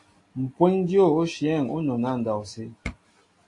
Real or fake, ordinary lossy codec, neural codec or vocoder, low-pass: real; AAC, 48 kbps; none; 10.8 kHz